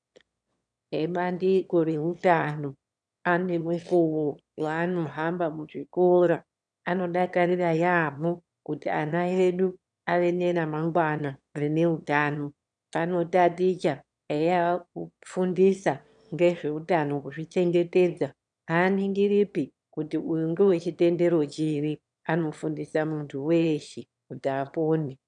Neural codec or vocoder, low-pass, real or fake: autoencoder, 22.05 kHz, a latent of 192 numbers a frame, VITS, trained on one speaker; 9.9 kHz; fake